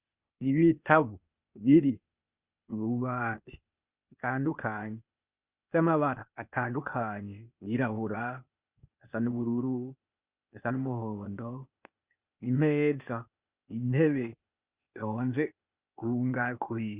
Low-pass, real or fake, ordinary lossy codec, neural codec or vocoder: 3.6 kHz; fake; Opus, 64 kbps; codec, 16 kHz, 0.8 kbps, ZipCodec